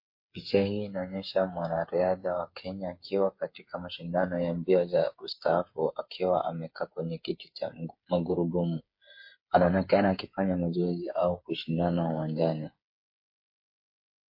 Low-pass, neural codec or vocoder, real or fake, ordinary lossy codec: 5.4 kHz; codec, 16 kHz, 8 kbps, FreqCodec, smaller model; fake; MP3, 32 kbps